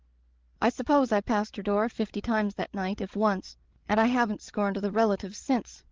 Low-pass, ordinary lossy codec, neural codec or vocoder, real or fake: 7.2 kHz; Opus, 16 kbps; codec, 16 kHz, 16 kbps, FreqCodec, larger model; fake